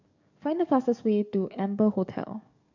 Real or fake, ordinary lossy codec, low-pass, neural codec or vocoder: fake; none; 7.2 kHz; codec, 44.1 kHz, 7.8 kbps, DAC